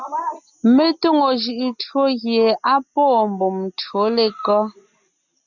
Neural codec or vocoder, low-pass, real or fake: none; 7.2 kHz; real